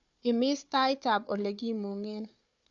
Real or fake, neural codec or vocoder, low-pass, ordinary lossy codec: fake; codec, 16 kHz, 4 kbps, FunCodec, trained on Chinese and English, 50 frames a second; 7.2 kHz; AAC, 64 kbps